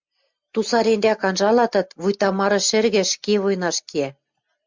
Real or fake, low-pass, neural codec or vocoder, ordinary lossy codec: real; 7.2 kHz; none; MP3, 64 kbps